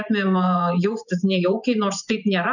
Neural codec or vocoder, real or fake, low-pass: vocoder, 24 kHz, 100 mel bands, Vocos; fake; 7.2 kHz